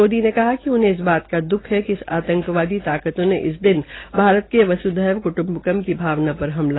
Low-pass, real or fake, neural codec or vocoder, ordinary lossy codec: 7.2 kHz; real; none; AAC, 16 kbps